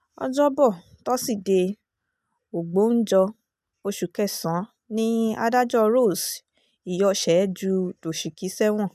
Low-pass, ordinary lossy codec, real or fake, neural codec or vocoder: 14.4 kHz; none; real; none